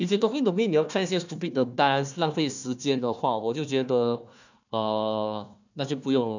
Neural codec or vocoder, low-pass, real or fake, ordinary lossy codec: codec, 16 kHz, 1 kbps, FunCodec, trained on Chinese and English, 50 frames a second; 7.2 kHz; fake; none